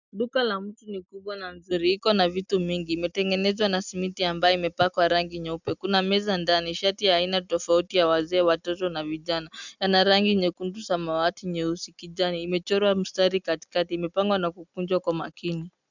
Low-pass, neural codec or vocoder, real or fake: 7.2 kHz; none; real